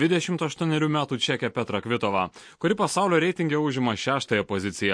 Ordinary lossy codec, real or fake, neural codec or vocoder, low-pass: MP3, 48 kbps; real; none; 9.9 kHz